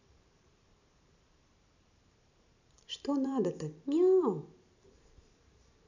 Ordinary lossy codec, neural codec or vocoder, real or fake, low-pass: none; vocoder, 44.1 kHz, 128 mel bands every 512 samples, BigVGAN v2; fake; 7.2 kHz